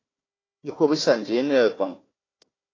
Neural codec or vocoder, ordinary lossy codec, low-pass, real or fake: codec, 16 kHz, 1 kbps, FunCodec, trained on Chinese and English, 50 frames a second; AAC, 32 kbps; 7.2 kHz; fake